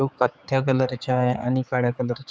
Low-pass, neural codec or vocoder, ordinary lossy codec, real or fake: none; codec, 16 kHz, 4 kbps, X-Codec, HuBERT features, trained on general audio; none; fake